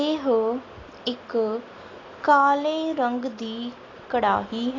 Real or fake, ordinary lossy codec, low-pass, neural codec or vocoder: real; AAC, 32 kbps; 7.2 kHz; none